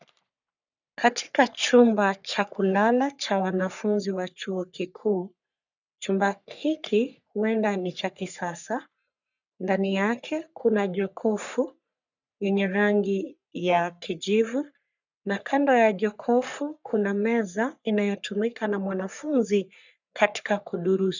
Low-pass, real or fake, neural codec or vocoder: 7.2 kHz; fake; codec, 44.1 kHz, 3.4 kbps, Pupu-Codec